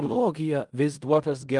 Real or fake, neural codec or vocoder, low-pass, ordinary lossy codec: fake; codec, 16 kHz in and 24 kHz out, 0.4 kbps, LongCat-Audio-Codec, fine tuned four codebook decoder; 10.8 kHz; Opus, 32 kbps